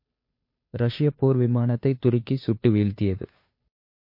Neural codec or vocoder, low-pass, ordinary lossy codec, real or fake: codec, 16 kHz, 2 kbps, FunCodec, trained on Chinese and English, 25 frames a second; 5.4 kHz; MP3, 32 kbps; fake